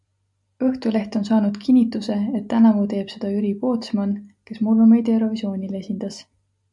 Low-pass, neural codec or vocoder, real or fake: 10.8 kHz; none; real